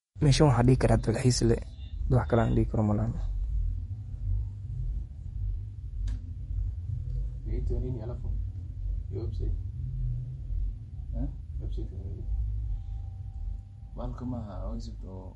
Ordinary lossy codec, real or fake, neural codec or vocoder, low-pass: MP3, 48 kbps; fake; vocoder, 48 kHz, 128 mel bands, Vocos; 19.8 kHz